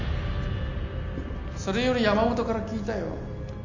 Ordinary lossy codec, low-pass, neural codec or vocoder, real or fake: none; 7.2 kHz; none; real